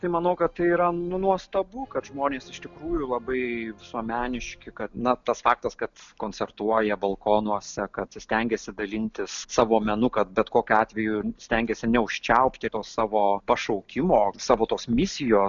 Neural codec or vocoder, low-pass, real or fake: none; 7.2 kHz; real